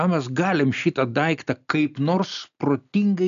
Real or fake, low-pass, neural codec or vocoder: real; 7.2 kHz; none